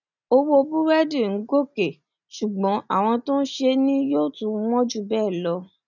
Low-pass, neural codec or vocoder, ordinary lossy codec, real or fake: 7.2 kHz; none; none; real